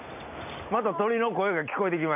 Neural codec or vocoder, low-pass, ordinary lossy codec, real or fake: none; 3.6 kHz; none; real